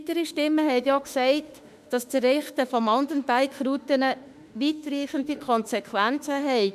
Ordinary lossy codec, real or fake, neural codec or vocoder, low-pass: none; fake; autoencoder, 48 kHz, 32 numbers a frame, DAC-VAE, trained on Japanese speech; 14.4 kHz